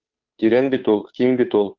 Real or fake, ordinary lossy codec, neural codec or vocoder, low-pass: fake; Opus, 32 kbps; codec, 16 kHz, 2 kbps, FunCodec, trained on Chinese and English, 25 frames a second; 7.2 kHz